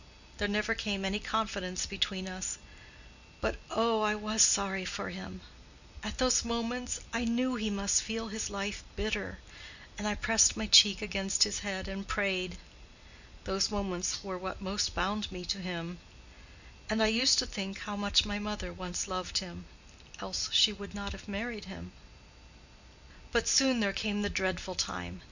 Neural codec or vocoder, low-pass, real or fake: none; 7.2 kHz; real